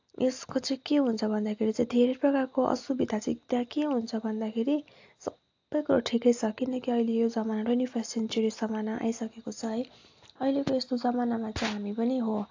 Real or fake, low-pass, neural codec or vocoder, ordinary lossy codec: real; 7.2 kHz; none; AAC, 48 kbps